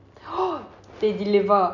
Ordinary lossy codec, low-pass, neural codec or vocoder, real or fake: none; 7.2 kHz; none; real